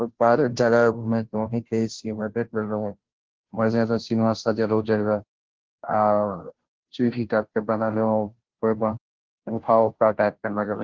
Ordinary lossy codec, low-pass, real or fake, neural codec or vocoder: Opus, 16 kbps; 7.2 kHz; fake; codec, 16 kHz, 0.5 kbps, FunCodec, trained on Chinese and English, 25 frames a second